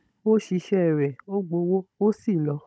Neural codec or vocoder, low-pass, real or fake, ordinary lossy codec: codec, 16 kHz, 16 kbps, FunCodec, trained on Chinese and English, 50 frames a second; none; fake; none